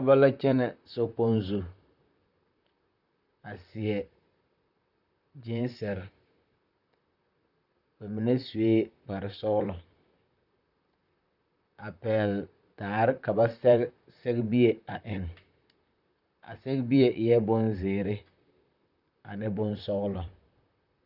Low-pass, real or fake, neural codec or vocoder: 5.4 kHz; fake; vocoder, 44.1 kHz, 128 mel bands, Pupu-Vocoder